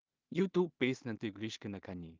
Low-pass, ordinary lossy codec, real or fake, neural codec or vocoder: 7.2 kHz; Opus, 24 kbps; fake; codec, 16 kHz in and 24 kHz out, 0.4 kbps, LongCat-Audio-Codec, two codebook decoder